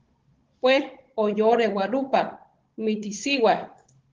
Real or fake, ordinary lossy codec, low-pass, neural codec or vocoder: fake; Opus, 16 kbps; 7.2 kHz; codec, 16 kHz, 16 kbps, FunCodec, trained on Chinese and English, 50 frames a second